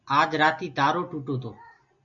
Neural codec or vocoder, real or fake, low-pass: none; real; 7.2 kHz